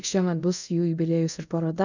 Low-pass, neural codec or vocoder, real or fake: 7.2 kHz; codec, 24 kHz, 0.5 kbps, DualCodec; fake